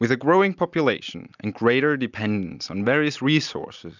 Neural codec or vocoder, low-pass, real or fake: none; 7.2 kHz; real